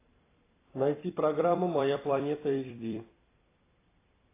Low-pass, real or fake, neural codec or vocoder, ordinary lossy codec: 3.6 kHz; fake; vocoder, 44.1 kHz, 128 mel bands every 256 samples, BigVGAN v2; AAC, 16 kbps